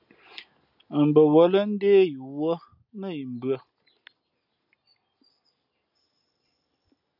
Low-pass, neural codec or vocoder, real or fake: 5.4 kHz; none; real